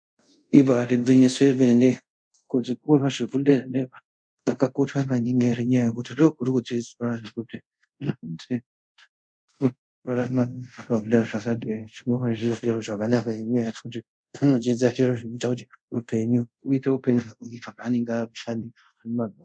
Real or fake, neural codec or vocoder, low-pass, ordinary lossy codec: fake; codec, 24 kHz, 0.5 kbps, DualCodec; 9.9 kHz; MP3, 96 kbps